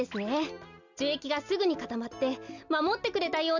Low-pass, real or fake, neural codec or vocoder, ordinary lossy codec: 7.2 kHz; real; none; none